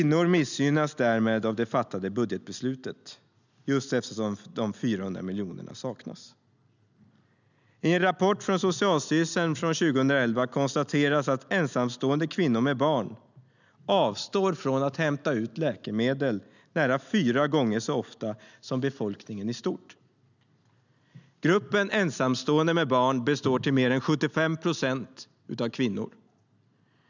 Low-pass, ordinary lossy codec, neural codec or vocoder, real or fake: 7.2 kHz; none; none; real